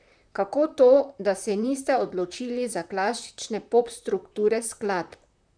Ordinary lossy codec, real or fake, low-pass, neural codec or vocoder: none; fake; 9.9 kHz; vocoder, 44.1 kHz, 128 mel bands, Pupu-Vocoder